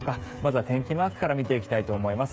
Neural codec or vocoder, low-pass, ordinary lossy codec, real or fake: codec, 16 kHz, 8 kbps, FreqCodec, smaller model; none; none; fake